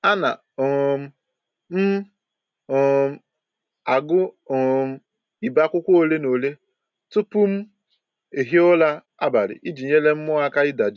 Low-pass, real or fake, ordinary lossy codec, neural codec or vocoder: 7.2 kHz; real; none; none